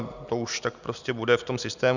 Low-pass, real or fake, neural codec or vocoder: 7.2 kHz; real; none